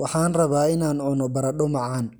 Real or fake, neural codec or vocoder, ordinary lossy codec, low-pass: real; none; none; none